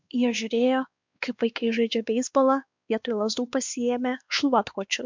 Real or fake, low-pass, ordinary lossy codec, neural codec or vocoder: fake; 7.2 kHz; MP3, 64 kbps; codec, 16 kHz, 2 kbps, X-Codec, WavLM features, trained on Multilingual LibriSpeech